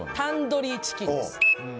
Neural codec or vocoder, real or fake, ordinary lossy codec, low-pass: none; real; none; none